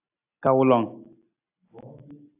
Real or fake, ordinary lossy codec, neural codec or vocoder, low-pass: real; AAC, 32 kbps; none; 3.6 kHz